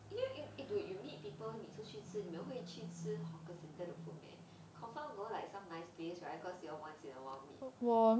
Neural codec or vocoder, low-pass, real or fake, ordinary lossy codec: none; none; real; none